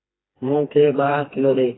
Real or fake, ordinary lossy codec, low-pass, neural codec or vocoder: fake; AAC, 16 kbps; 7.2 kHz; codec, 16 kHz, 2 kbps, FreqCodec, smaller model